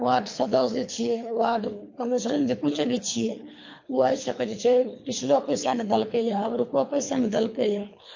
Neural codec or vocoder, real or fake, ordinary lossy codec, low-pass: codec, 24 kHz, 3 kbps, HILCodec; fake; MP3, 48 kbps; 7.2 kHz